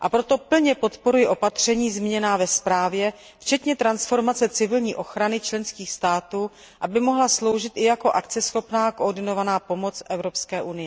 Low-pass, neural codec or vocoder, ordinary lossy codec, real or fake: none; none; none; real